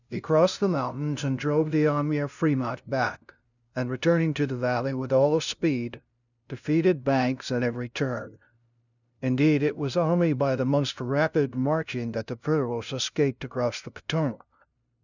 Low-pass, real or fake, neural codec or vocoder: 7.2 kHz; fake; codec, 16 kHz, 0.5 kbps, FunCodec, trained on LibriTTS, 25 frames a second